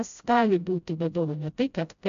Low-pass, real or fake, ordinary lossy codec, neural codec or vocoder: 7.2 kHz; fake; AAC, 64 kbps; codec, 16 kHz, 0.5 kbps, FreqCodec, smaller model